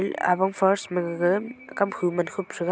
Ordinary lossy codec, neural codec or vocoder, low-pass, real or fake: none; none; none; real